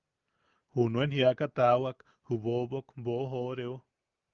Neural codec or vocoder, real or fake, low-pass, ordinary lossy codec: none; real; 7.2 kHz; Opus, 32 kbps